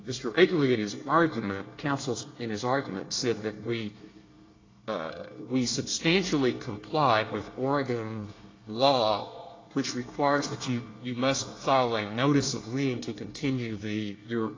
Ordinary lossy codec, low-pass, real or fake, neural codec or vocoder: AAC, 32 kbps; 7.2 kHz; fake; codec, 24 kHz, 1 kbps, SNAC